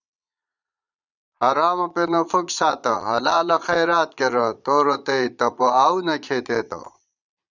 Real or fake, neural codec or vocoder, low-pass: fake; vocoder, 22.05 kHz, 80 mel bands, Vocos; 7.2 kHz